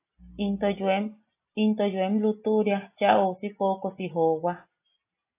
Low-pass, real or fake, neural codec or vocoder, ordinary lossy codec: 3.6 kHz; real; none; AAC, 24 kbps